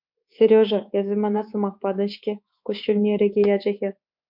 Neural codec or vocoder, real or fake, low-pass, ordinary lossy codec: codec, 24 kHz, 3.1 kbps, DualCodec; fake; 5.4 kHz; MP3, 48 kbps